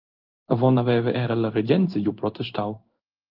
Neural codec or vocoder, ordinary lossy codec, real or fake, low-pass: codec, 16 kHz in and 24 kHz out, 1 kbps, XY-Tokenizer; Opus, 32 kbps; fake; 5.4 kHz